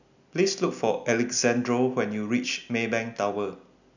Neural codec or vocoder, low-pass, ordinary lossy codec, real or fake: none; 7.2 kHz; none; real